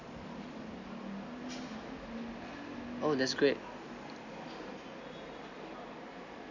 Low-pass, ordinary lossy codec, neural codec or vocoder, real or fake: 7.2 kHz; none; none; real